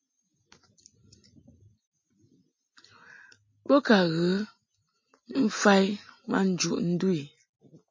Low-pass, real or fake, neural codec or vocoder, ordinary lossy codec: 7.2 kHz; real; none; MP3, 32 kbps